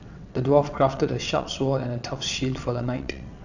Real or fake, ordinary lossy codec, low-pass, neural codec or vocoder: fake; none; 7.2 kHz; vocoder, 22.05 kHz, 80 mel bands, WaveNeXt